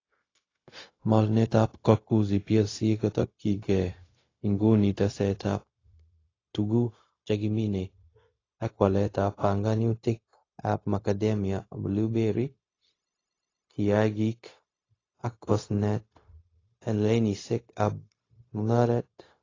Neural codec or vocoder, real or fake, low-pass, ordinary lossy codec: codec, 16 kHz, 0.4 kbps, LongCat-Audio-Codec; fake; 7.2 kHz; AAC, 32 kbps